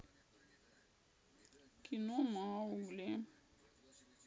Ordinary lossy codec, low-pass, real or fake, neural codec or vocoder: none; none; real; none